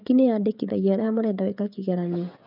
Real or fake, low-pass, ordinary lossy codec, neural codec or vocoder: fake; 5.4 kHz; none; codec, 16 kHz, 16 kbps, FreqCodec, smaller model